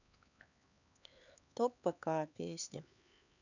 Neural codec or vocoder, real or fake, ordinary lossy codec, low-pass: codec, 16 kHz, 4 kbps, X-Codec, HuBERT features, trained on LibriSpeech; fake; none; 7.2 kHz